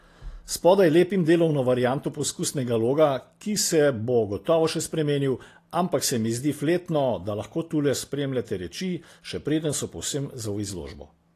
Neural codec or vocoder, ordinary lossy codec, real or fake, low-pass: none; AAC, 48 kbps; real; 14.4 kHz